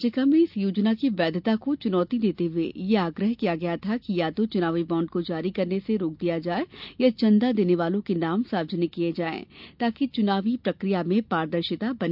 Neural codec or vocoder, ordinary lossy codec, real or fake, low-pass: none; none; real; 5.4 kHz